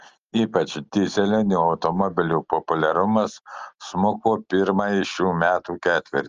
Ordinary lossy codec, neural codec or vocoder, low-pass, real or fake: Opus, 24 kbps; none; 7.2 kHz; real